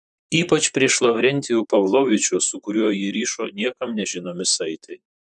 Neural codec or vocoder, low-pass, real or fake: vocoder, 44.1 kHz, 128 mel bands, Pupu-Vocoder; 10.8 kHz; fake